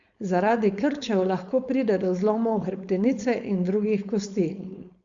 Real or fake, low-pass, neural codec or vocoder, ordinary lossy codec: fake; 7.2 kHz; codec, 16 kHz, 4.8 kbps, FACodec; Opus, 64 kbps